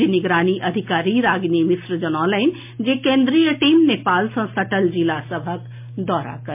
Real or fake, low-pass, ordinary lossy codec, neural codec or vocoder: real; 3.6 kHz; MP3, 32 kbps; none